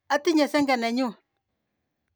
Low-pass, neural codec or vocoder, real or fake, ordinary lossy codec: none; none; real; none